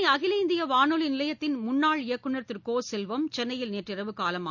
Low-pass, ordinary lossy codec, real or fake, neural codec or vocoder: 7.2 kHz; none; real; none